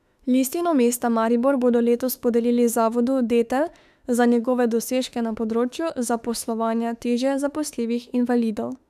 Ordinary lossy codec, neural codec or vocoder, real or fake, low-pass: none; autoencoder, 48 kHz, 32 numbers a frame, DAC-VAE, trained on Japanese speech; fake; 14.4 kHz